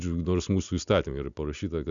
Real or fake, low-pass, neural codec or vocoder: real; 7.2 kHz; none